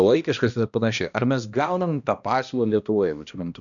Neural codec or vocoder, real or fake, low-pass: codec, 16 kHz, 1 kbps, X-Codec, HuBERT features, trained on balanced general audio; fake; 7.2 kHz